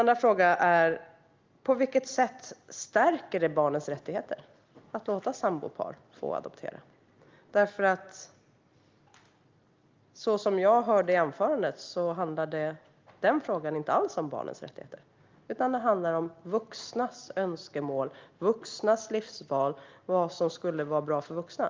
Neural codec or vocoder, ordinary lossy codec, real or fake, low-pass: none; Opus, 32 kbps; real; 7.2 kHz